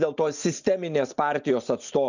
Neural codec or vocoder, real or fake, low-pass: none; real; 7.2 kHz